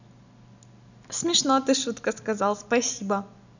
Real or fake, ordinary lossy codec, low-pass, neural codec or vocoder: real; none; 7.2 kHz; none